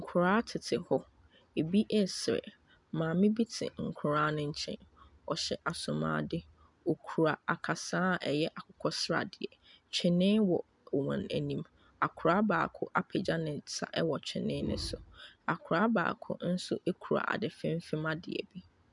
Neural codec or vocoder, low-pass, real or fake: none; 10.8 kHz; real